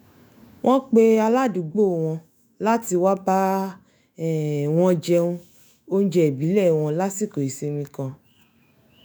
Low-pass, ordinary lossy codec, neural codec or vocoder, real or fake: none; none; autoencoder, 48 kHz, 128 numbers a frame, DAC-VAE, trained on Japanese speech; fake